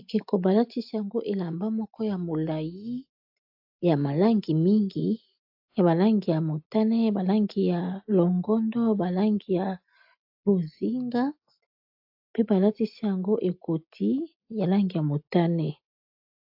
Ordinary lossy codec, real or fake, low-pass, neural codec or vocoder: AAC, 48 kbps; real; 5.4 kHz; none